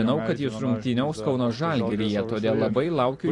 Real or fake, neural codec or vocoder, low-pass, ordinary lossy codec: fake; vocoder, 44.1 kHz, 128 mel bands every 256 samples, BigVGAN v2; 10.8 kHz; AAC, 48 kbps